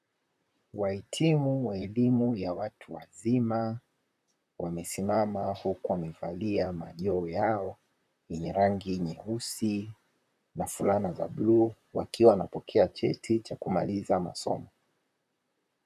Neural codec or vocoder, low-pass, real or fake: vocoder, 44.1 kHz, 128 mel bands, Pupu-Vocoder; 14.4 kHz; fake